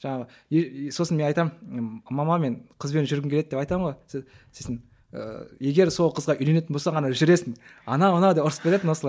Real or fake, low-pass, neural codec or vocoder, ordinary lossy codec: real; none; none; none